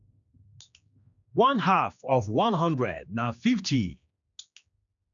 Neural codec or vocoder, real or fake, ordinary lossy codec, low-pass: codec, 16 kHz, 2 kbps, X-Codec, HuBERT features, trained on general audio; fake; none; 7.2 kHz